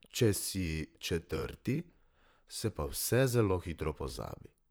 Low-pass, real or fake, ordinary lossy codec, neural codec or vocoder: none; fake; none; vocoder, 44.1 kHz, 128 mel bands, Pupu-Vocoder